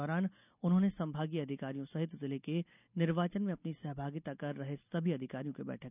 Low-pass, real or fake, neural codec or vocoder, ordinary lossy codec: 3.6 kHz; real; none; none